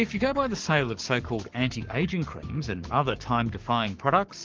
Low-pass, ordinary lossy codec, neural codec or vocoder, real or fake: 7.2 kHz; Opus, 24 kbps; codec, 44.1 kHz, 7.8 kbps, Pupu-Codec; fake